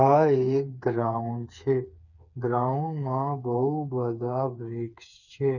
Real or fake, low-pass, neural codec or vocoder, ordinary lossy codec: fake; 7.2 kHz; codec, 16 kHz, 4 kbps, FreqCodec, smaller model; none